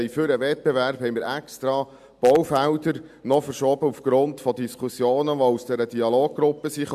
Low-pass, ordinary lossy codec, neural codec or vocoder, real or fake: 14.4 kHz; AAC, 96 kbps; none; real